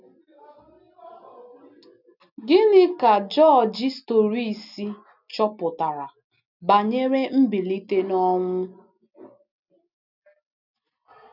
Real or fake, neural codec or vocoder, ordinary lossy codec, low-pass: real; none; none; 5.4 kHz